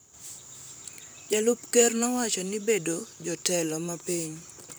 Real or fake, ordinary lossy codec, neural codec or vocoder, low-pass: fake; none; vocoder, 44.1 kHz, 128 mel bands, Pupu-Vocoder; none